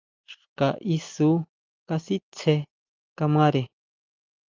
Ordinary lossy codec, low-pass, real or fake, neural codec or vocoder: Opus, 24 kbps; 7.2 kHz; real; none